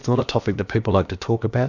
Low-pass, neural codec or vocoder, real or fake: 7.2 kHz; codec, 16 kHz, about 1 kbps, DyCAST, with the encoder's durations; fake